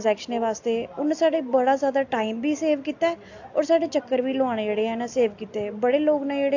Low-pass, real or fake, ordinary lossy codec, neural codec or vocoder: 7.2 kHz; real; AAC, 48 kbps; none